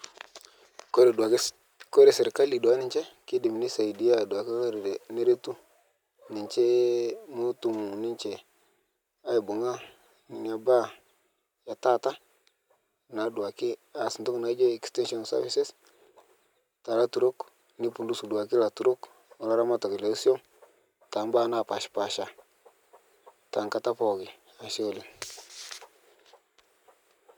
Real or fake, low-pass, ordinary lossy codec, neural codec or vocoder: real; 19.8 kHz; none; none